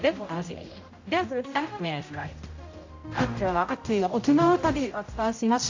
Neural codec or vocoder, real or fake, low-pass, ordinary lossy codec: codec, 16 kHz, 0.5 kbps, X-Codec, HuBERT features, trained on general audio; fake; 7.2 kHz; AAC, 48 kbps